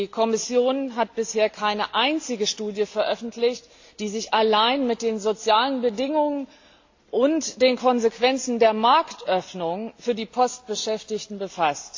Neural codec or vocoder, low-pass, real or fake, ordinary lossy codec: none; 7.2 kHz; real; AAC, 48 kbps